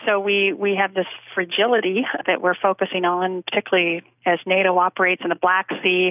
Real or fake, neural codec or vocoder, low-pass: real; none; 3.6 kHz